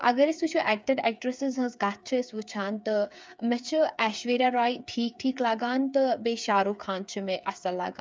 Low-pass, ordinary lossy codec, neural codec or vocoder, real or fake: none; none; codec, 16 kHz, 8 kbps, FreqCodec, smaller model; fake